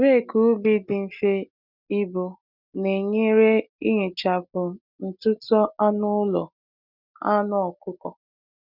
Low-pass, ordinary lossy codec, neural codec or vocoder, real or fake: 5.4 kHz; none; none; real